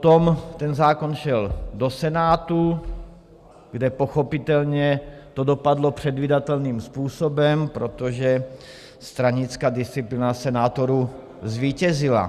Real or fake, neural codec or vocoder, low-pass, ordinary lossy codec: real; none; 14.4 kHz; Opus, 64 kbps